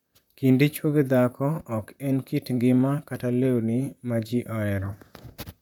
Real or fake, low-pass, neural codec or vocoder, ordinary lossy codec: fake; 19.8 kHz; vocoder, 44.1 kHz, 128 mel bands, Pupu-Vocoder; none